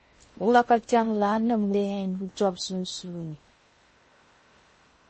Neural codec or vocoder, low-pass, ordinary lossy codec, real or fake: codec, 16 kHz in and 24 kHz out, 0.6 kbps, FocalCodec, streaming, 2048 codes; 10.8 kHz; MP3, 32 kbps; fake